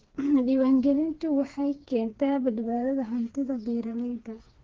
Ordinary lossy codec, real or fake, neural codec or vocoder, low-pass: Opus, 16 kbps; fake; codec, 16 kHz, 4 kbps, FreqCodec, smaller model; 7.2 kHz